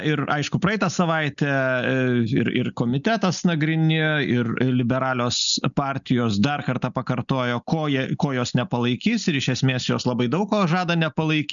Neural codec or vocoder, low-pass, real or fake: none; 7.2 kHz; real